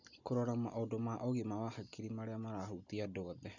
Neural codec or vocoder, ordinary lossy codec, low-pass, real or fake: none; none; 7.2 kHz; real